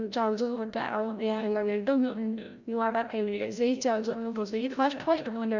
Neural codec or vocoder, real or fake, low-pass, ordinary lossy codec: codec, 16 kHz, 0.5 kbps, FreqCodec, larger model; fake; 7.2 kHz; none